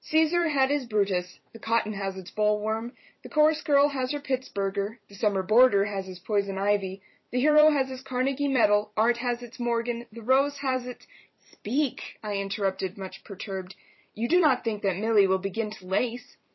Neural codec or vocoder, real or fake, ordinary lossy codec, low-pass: vocoder, 44.1 kHz, 128 mel bands every 256 samples, BigVGAN v2; fake; MP3, 24 kbps; 7.2 kHz